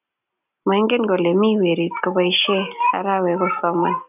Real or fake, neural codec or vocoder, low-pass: real; none; 3.6 kHz